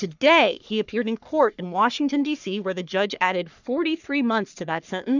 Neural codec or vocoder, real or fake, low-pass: codec, 44.1 kHz, 3.4 kbps, Pupu-Codec; fake; 7.2 kHz